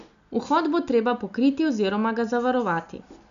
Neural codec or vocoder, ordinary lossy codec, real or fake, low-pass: none; none; real; 7.2 kHz